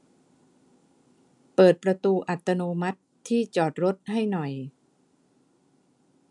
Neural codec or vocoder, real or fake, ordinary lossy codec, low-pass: none; real; none; 10.8 kHz